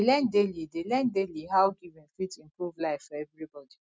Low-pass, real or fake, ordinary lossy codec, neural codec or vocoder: none; real; none; none